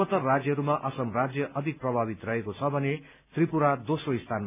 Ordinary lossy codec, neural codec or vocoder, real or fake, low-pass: none; none; real; 3.6 kHz